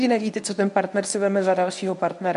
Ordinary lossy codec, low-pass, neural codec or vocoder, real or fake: AAC, 96 kbps; 10.8 kHz; codec, 24 kHz, 0.9 kbps, WavTokenizer, medium speech release version 1; fake